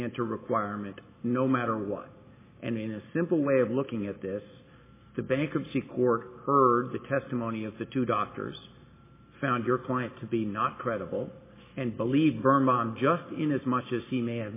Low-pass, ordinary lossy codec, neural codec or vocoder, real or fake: 3.6 kHz; MP3, 16 kbps; none; real